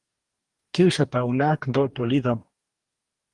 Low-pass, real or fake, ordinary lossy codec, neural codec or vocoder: 10.8 kHz; fake; Opus, 24 kbps; codec, 44.1 kHz, 2.6 kbps, DAC